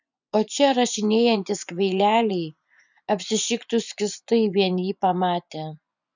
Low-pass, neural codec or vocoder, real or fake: 7.2 kHz; vocoder, 24 kHz, 100 mel bands, Vocos; fake